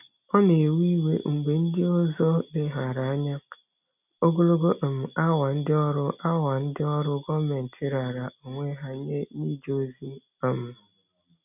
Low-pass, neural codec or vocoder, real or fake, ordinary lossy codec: 3.6 kHz; none; real; none